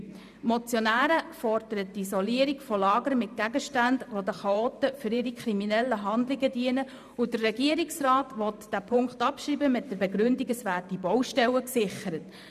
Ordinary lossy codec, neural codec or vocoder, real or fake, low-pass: none; vocoder, 44.1 kHz, 128 mel bands every 512 samples, BigVGAN v2; fake; 14.4 kHz